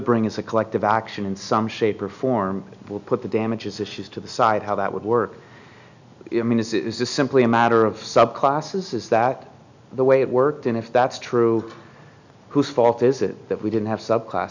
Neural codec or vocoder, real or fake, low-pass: none; real; 7.2 kHz